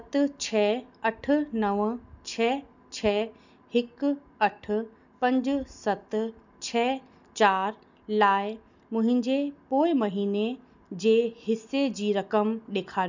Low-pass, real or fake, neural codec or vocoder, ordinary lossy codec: 7.2 kHz; real; none; none